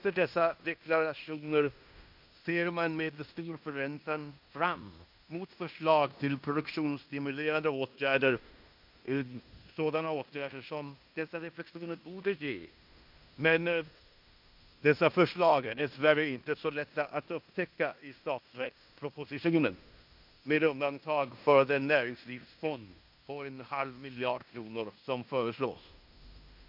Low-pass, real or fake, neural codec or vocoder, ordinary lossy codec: 5.4 kHz; fake; codec, 16 kHz in and 24 kHz out, 0.9 kbps, LongCat-Audio-Codec, fine tuned four codebook decoder; MP3, 48 kbps